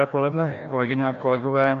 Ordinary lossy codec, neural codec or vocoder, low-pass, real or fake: AAC, 64 kbps; codec, 16 kHz, 1 kbps, FreqCodec, larger model; 7.2 kHz; fake